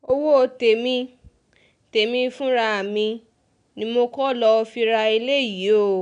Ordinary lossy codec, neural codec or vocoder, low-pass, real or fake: none; none; 9.9 kHz; real